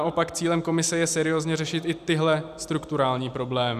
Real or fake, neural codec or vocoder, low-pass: real; none; 14.4 kHz